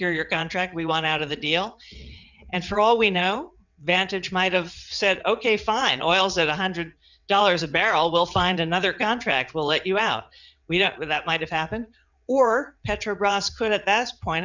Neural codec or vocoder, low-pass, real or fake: vocoder, 22.05 kHz, 80 mel bands, WaveNeXt; 7.2 kHz; fake